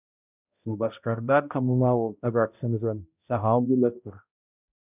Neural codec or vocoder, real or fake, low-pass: codec, 16 kHz, 0.5 kbps, X-Codec, HuBERT features, trained on balanced general audio; fake; 3.6 kHz